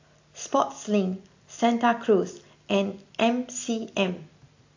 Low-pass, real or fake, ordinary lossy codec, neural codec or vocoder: 7.2 kHz; real; AAC, 48 kbps; none